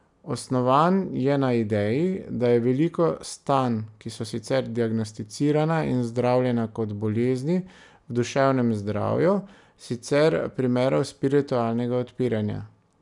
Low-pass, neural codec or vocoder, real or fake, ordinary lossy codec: 10.8 kHz; none; real; none